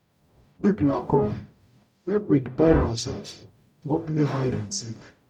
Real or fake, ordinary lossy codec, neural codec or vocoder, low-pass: fake; none; codec, 44.1 kHz, 0.9 kbps, DAC; 19.8 kHz